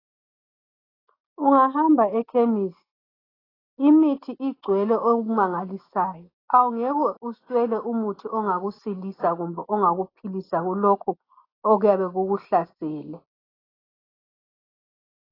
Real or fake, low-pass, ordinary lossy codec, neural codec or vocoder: real; 5.4 kHz; AAC, 24 kbps; none